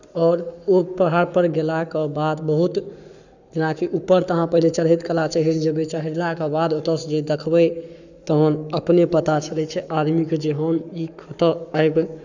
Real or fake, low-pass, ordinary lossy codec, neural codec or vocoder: fake; 7.2 kHz; none; codec, 44.1 kHz, 7.8 kbps, Pupu-Codec